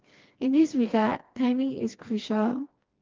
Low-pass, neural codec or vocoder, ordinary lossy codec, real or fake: 7.2 kHz; codec, 16 kHz, 2 kbps, FreqCodec, smaller model; Opus, 32 kbps; fake